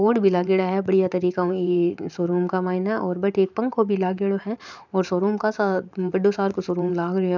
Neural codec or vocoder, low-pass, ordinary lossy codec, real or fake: vocoder, 44.1 kHz, 128 mel bands every 512 samples, BigVGAN v2; 7.2 kHz; none; fake